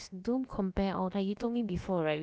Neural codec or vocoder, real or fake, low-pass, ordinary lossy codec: codec, 16 kHz, about 1 kbps, DyCAST, with the encoder's durations; fake; none; none